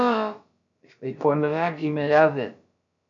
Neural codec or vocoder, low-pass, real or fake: codec, 16 kHz, about 1 kbps, DyCAST, with the encoder's durations; 7.2 kHz; fake